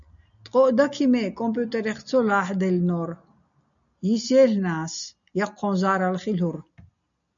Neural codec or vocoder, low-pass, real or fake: none; 7.2 kHz; real